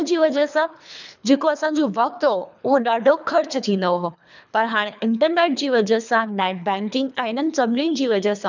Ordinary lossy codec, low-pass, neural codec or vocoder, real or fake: none; 7.2 kHz; codec, 24 kHz, 3 kbps, HILCodec; fake